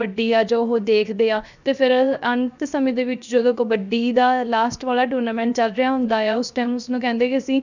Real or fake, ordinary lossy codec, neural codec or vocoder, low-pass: fake; none; codec, 16 kHz, 0.7 kbps, FocalCodec; 7.2 kHz